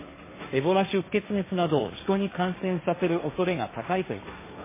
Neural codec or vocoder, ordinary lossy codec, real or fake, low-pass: codec, 16 kHz, 1.1 kbps, Voila-Tokenizer; MP3, 16 kbps; fake; 3.6 kHz